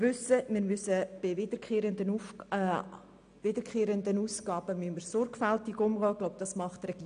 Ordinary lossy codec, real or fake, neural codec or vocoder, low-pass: MP3, 96 kbps; real; none; 9.9 kHz